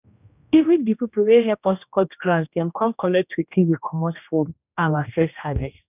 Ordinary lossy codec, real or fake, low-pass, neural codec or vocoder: none; fake; 3.6 kHz; codec, 16 kHz, 1 kbps, X-Codec, HuBERT features, trained on general audio